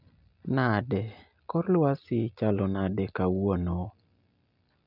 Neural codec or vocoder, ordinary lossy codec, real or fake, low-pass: none; none; real; 5.4 kHz